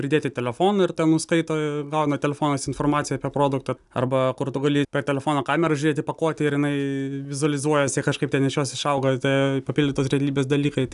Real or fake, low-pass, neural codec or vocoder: real; 10.8 kHz; none